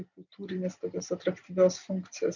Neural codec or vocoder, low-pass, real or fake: none; 7.2 kHz; real